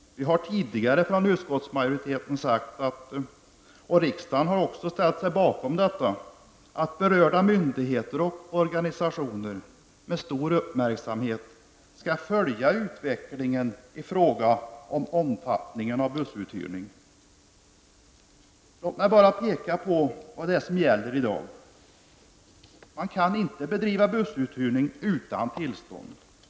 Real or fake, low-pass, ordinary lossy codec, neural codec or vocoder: real; none; none; none